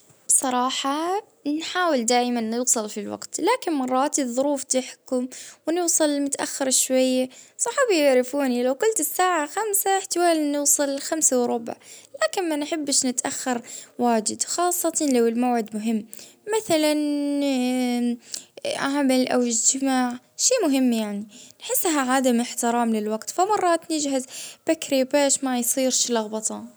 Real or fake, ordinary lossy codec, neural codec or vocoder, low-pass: real; none; none; none